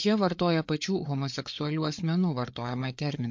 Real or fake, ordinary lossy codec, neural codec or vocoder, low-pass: fake; MP3, 48 kbps; codec, 16 kHz, 4 kbps, FreqCodec, larger model; 7.2 kHz